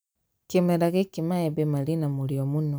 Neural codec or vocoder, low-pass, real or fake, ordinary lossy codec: none; none; real; none